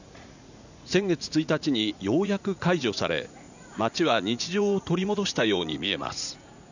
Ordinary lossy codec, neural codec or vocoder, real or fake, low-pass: none; vocoder, 44.1 kHz, 80 mel bands, Vocos; fake; 7.2 kHz